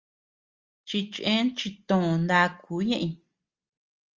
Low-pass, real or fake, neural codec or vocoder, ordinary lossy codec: 7.2 kHz; real; none; Opus, 32 kbps